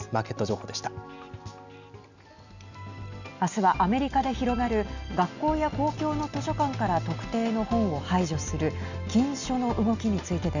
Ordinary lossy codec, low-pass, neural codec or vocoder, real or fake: none; 7.2 kHz; none; real